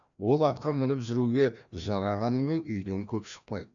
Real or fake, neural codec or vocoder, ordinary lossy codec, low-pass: fake; codec, 16 kHz, 1 kbps, FreqCodec, larger model; none; 7.2 kHz